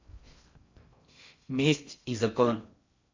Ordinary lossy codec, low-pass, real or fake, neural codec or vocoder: MP3, 64 kbps; 7.2 kHz; fake; codec, 16 kHz in and 24 kHz out, 0.6 kbps, FocalCodec, streaming, 4096 codes